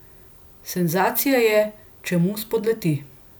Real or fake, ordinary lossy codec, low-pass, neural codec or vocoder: real; none; none; none